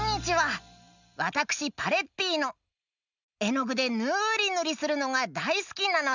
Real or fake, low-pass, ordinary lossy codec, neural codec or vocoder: real; 7.2 kHz; none; none